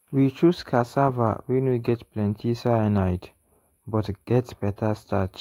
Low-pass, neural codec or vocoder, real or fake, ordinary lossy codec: 19.8 kHz; autoencoder, 48 kHz, 128 numbers a frame, DAC-VAE, trained on Japanese speech; fake; AAC, 48 kbps